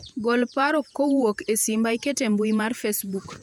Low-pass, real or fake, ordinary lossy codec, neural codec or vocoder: 19.8 kHz; fake; none; vocoder, 48 kHz, 128 mel bands, Vocos